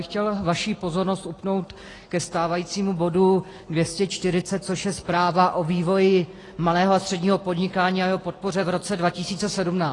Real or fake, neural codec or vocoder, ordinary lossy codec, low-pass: real; none; AAC, 32 kbps; 10.8 kHz